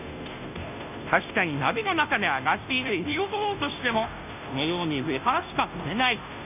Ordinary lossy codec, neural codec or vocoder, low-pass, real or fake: none; codec, 16 kHz, 0.5 kbps, FunCodec, trained on Chinese and English, 25 frames a second; 3.6 kHz; fake